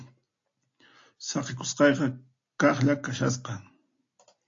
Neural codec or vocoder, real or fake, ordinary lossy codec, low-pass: none; real; AAC, 48 kbps; 7.2 kHz